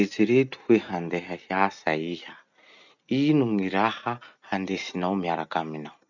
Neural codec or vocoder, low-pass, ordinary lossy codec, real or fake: none; 7.2 kHz; none; real